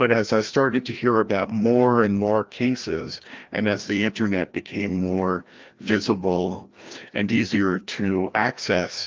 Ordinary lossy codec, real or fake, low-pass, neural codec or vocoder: Opus, 32 kbps; fake; 7.2 kHz; codec, 16 kHz, 1 kbps, FreqCodec, larger model